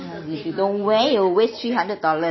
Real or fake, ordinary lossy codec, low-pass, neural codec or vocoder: real; MP3, 24 kbps; 7.2 kHz; none